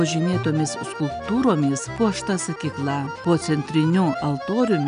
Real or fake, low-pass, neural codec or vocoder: real; 9.9 kHz; none